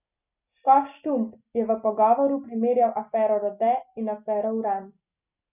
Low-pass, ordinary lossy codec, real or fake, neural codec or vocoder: 3.6 kHz; none; real; none